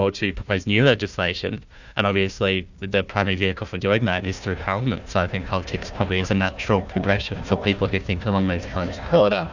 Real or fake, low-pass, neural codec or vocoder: fake; 7.2 kHz; codec, 16 kHz, 1 kbps, FunCodec, trained on Chinese and English, 50 frames a second